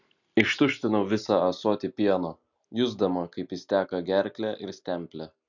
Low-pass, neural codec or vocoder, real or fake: 7.2 kHz; none; real